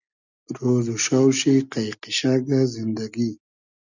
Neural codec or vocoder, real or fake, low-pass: none; real; 7.2 kHz